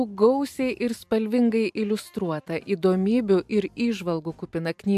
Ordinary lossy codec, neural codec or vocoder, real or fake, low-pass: AAC, 96 kbps; vocoder, 44.1 kHz, 128 mel bands every 512 samples, BigVGAN v2; fake; 14.4 kHz